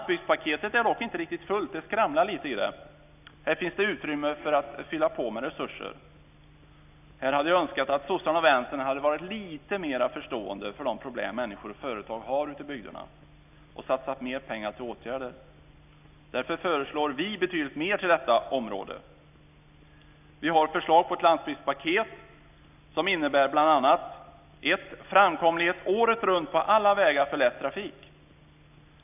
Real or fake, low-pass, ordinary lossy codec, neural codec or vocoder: real; 3.6 kHz; none; none